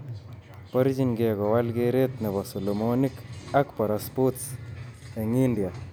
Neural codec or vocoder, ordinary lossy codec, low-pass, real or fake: none; none; none; real